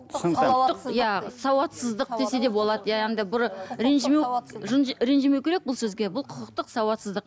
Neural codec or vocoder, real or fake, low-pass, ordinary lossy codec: none; real; none; none